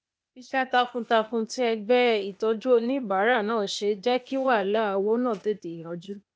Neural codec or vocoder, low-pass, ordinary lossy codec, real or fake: codec, 16 kHz, 0.8 kbps, ZipCodec; none; none; fake